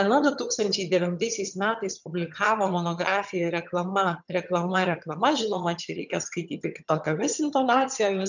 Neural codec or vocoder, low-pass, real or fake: vocoder, 22.05 kHz, 80 mel bands, HiFi-GAN; 7.2 kHz; fake